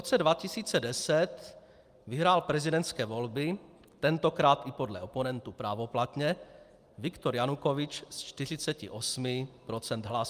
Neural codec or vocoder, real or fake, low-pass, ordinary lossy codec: none; real; 14.4 kHz; Opus, 32 kbps